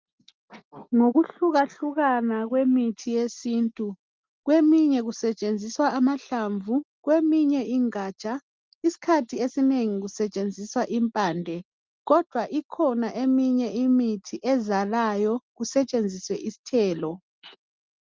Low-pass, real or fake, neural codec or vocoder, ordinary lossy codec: 7.2 kHz; real; none; Opus, 24 kbps